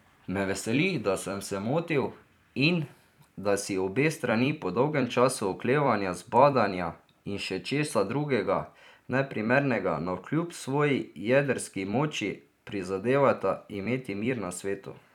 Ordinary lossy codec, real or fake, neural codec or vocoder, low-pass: none; fake; vocoder, 44.1 kHz, 128 mel bands every 256 samples, BigVGAN v2; 19.8 kHz